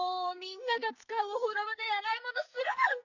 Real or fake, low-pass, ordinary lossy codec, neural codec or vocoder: fake; 7.2 kHz; none; codec, 44.1 kHz, 2.6 kbps, SNAC